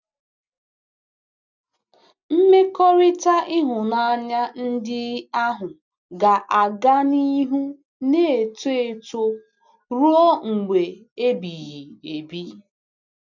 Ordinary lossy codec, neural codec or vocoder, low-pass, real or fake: none; none; 7.2 kHz; real